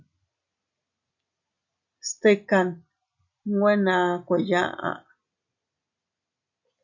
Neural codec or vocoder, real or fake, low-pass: none; real; 7.2 kHz